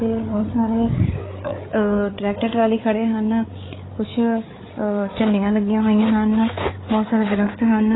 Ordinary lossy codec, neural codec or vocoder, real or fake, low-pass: AAC, 16 kbps; codec, 16 kHz, 8 kbps, FreqCodec, larger model; fake; 7.2 kHz